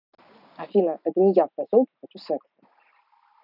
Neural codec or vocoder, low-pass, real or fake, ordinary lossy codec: none; 5.4 kHz; real; none